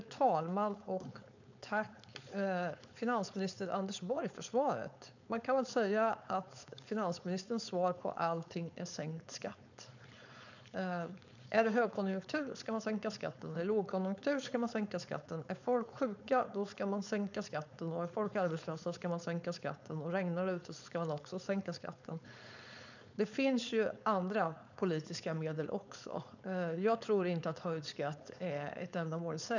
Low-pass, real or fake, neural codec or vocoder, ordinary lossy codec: 7.2 kHz; fake; codec, 16 kHz, 4.8 kbps, FACodec; none